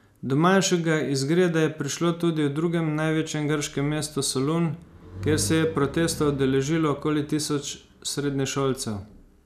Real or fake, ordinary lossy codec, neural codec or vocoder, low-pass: real; none; none; 14.4 kHz